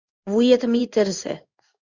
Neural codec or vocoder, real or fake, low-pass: codec, 16 kHz in and 24 kHz out, 1 kbps, XY-Tokenizer; fake; 7.2 kHz